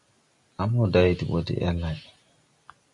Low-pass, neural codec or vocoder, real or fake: 10.8 kHz; none; real